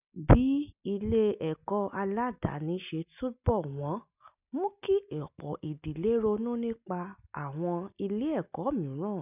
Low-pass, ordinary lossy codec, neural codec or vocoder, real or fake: 3.6 kHz; none; none; real